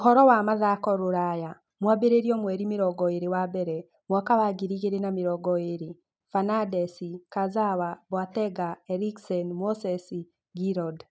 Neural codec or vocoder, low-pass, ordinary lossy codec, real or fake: none; none; none; real